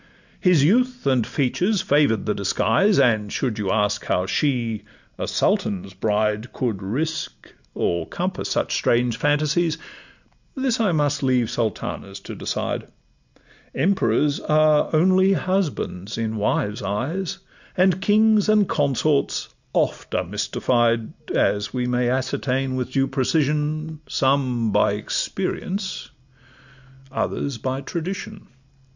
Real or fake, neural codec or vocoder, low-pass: real; none; 7.2 kHz